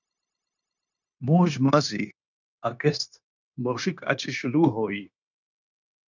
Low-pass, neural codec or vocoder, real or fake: 7.2 kHz; codec, 16 kHz, 0.9 kbps, LongCat-Audio-Codec; fake